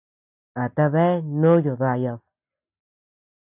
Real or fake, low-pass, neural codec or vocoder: real; 3.6 kHz; none